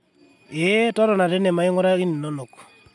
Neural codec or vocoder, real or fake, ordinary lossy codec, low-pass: none; real; none; none